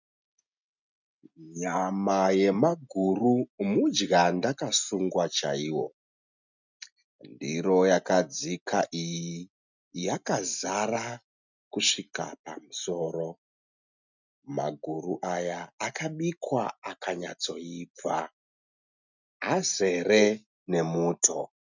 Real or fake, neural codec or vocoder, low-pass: real; none; 7.2 kHz